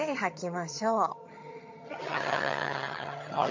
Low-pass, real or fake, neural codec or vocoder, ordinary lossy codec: 7.2 kHz; fake; vocoder, 22.05 kHz, 80 mel bands, HiFi-GAN; MP3, 48 kbps